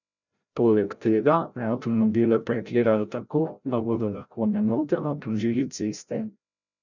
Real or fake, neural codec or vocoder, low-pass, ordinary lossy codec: fake; codec, 16 kHz, 0.5 kbps, FreqCodec, larger model; 7.2 kHz; none